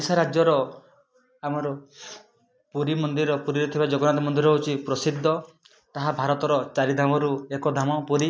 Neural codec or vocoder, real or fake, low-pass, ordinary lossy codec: none; real; none; none